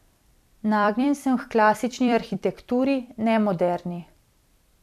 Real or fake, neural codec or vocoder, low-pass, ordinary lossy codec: fake; vocoder, 44.1 kHz, 128 mel bands every 256 samples, BigVGAN v2; 14.4 kHz; none